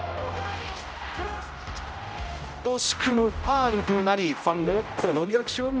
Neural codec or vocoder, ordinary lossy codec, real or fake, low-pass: codec, 16 kHz, 0.5 kbps, X-Codec, HuBERT features, trained on general audio; none; fake; none